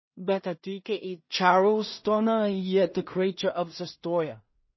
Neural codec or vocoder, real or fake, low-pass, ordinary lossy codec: codec, 16 kHz in and 24 kHz out, 0.4 kbps, LongCat-Audio-Codec, two codebook decoder; fake; 7.2 kHz; MP3, 24 kbps